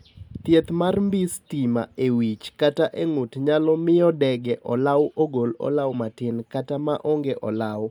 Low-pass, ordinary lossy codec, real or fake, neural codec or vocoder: 19.8 kHz; MP3, 96 kbps; real; none